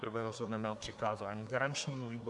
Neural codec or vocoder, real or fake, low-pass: codec, 24 kHz, 1 kbps, SNAC; fake; 10.8 kHz